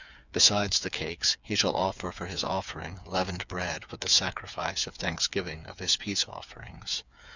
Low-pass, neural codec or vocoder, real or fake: 7.2 kHz; codec, 16 kHz, 8 kbps, FreqCodec, smaller model; fake